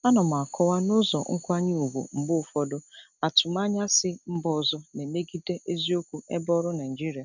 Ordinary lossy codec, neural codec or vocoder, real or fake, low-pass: none; none; real; 7.2 kHz